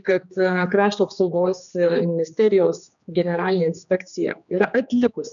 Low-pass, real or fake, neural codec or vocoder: 7.2 kHz; fake; codec, 16 kHz, 2 kbps, X-Codec, HuBERT features, trained on general audio